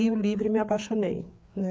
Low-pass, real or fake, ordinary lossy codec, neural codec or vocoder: none; fake; none; codec, 16 kHz, 4 kbps, FreqCodec, larger model